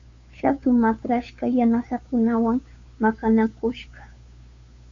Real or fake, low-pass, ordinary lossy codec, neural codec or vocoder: fake; 7.2 kHz; MP3, 48 kbps; codec, 16 kHz, 2 kbps, FunCodec, trained on Chinese and English, 25 frames a second